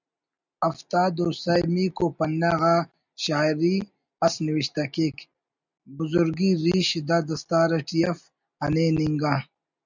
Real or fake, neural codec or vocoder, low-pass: real; none; 7.2 kHz